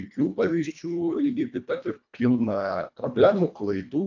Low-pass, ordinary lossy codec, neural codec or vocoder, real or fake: 7.2 kHz; AAC, 48 kbps; codec, 24 kHz, 1.5 kbps, HILCodec; fake